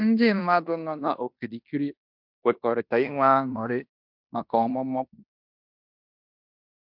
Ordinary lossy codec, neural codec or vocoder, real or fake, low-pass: MP3, 48 kbps; codec, 16 kHz in and 24 kHz out, 0.9 kbps, LongCat-Audio-Codec, fine tuned four codebook decoder; fake; 5.4 kHz